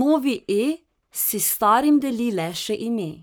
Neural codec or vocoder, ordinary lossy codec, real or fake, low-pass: codec, 44.1 kHz, 7.8 kbps, Pupu-Codec; none; fake; none